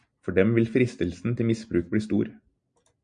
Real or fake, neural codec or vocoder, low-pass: real; none; 9.9 kHz